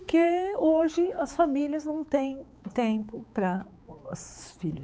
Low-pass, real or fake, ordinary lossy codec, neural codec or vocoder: none; fake; none; codec, 16 kHz, 4 kbps, X-Codec, HuBERT features, trained on balanced general audio